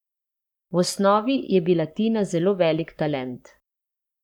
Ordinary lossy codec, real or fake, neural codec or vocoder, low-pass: none; fake; codec, 44.1 kHz, 7.8 kbps, Pupu-Codec; 19.8 kHz